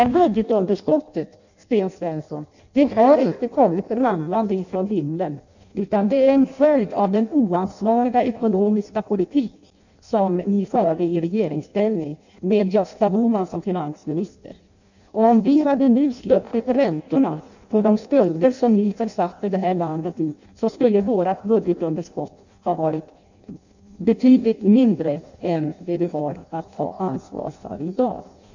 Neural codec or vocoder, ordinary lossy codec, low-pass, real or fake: codec, 16 kHz in and 24 kHz out, 0.6 kbps, FireRedTTS-2 codec; none; 7.2 kHz; fake